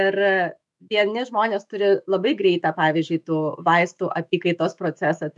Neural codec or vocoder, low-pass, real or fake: autoencoder, 48 kHz, 128 numbers a frame, DAC-VAE, trained on Japanese speech; 10.8 kHz; fake